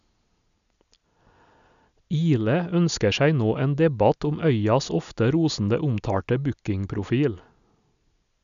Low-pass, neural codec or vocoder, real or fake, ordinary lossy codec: 7.2 kHz; none; real; none